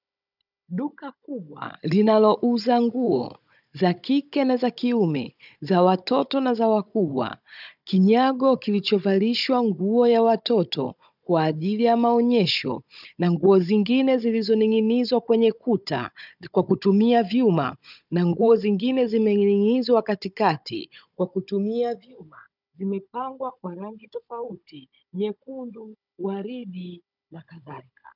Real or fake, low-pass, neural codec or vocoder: fake; 5.4 kHz; codec, 16 kHz, 16 kbps, FunCodec, trained on Chinese and English, 50 frames a second